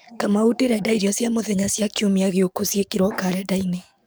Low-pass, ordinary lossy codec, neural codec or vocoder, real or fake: none; none; codec, 44.1 kHz, 7.8 kbps, DAC; fake